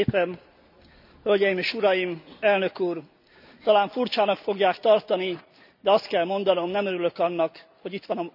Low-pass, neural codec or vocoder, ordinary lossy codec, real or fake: 5.4 kHz; none; none; real